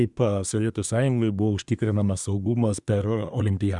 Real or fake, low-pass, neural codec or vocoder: fake; 10.8 kHz; codec, 24 kHz, 1 kbps, SNAC